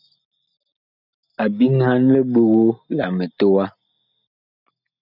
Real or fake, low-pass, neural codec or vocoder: real; 5.4 kHz; none